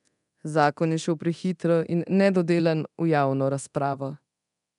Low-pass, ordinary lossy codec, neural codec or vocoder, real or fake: 10.8 kHz; none; codec, 24 kHz, 0.9 kbps, DualCodec; fake